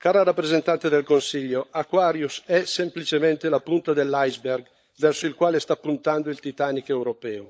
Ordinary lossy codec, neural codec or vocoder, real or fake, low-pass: none; codec, 16 kHz, 16 kbps, FunCodec, trained on LibriTTS, 50 frames a second; fake; none